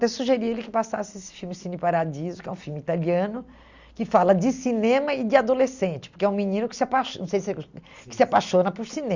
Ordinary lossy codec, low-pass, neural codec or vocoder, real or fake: Opus, 64 kbps; 7.2 kHz; none; real